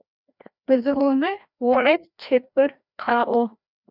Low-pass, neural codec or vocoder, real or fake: 5.4 kHz; codec, 16 kHz, 1 kbps, FreqCodec, larger model; fake